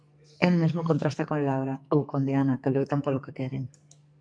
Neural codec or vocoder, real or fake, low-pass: codec, 44.1 kHz, 2.6 kbps, SNAC; fake; 9.9 kHz